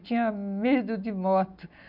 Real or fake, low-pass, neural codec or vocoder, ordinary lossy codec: real; 5.4 kHz; none; none